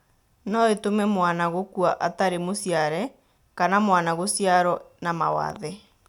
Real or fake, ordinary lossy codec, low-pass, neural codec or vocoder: real; none; 19.8 kHz; none